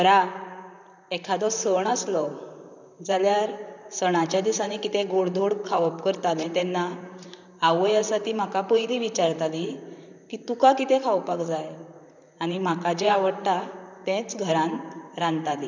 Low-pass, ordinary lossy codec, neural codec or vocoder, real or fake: 7.2 kHz; none; vocoder, 44.1 kHz, 128 mel bands, Pupu-Vocoder; fake